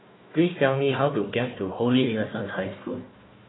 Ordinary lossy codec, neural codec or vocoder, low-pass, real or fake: AAC, 16 kbps; codec, 16 kHz, 1 kbps, FunCodec, trained on Chinese and English, 50 frames a second; 7.2 kHz; fake